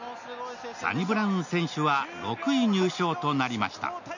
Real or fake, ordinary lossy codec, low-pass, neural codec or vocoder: real; none; 7.2 kHz; none